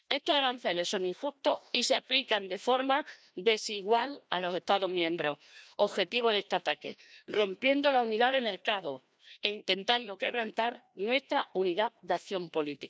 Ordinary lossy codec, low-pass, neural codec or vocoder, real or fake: none; none; codec, 16 kHz, 1 kbps, FreqCodec, larger model; fake